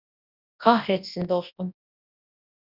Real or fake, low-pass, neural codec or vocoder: fake; 5.4 kHz; codec, 24 kHz, 0.9 kbps, WavTokenizer, large speech release